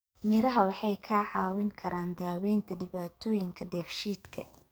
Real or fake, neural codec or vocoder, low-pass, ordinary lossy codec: fake; codec, 44.1 kHz, 2.6 kbps, SNAC; none; none